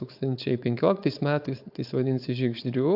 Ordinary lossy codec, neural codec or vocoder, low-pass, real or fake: AAC, 48 kbps; codec, 16 kHz, 4.8 kbps, FACodec; 5.4 kHz; fake